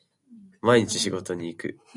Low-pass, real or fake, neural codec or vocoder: 10.8 kHz; real; none